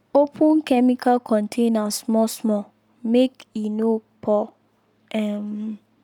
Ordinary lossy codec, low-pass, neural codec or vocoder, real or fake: none; 19.8 kHz; codec, 44.1 kHz, 7.8 kbps, Pupu-Codec; fake